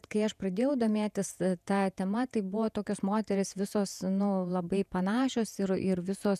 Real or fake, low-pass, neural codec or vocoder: fake; 14.4 kHz; vocoder, 48 kHz, 128 mel bands, Vocos